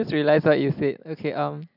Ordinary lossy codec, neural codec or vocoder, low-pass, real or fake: none; none; 5.4 kHz; real